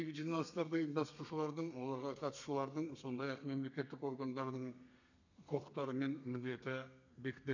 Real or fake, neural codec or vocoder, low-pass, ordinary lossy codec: fake; codec, 32 kHz, 1.9 kbps, SNAC; 7.2 kHz; none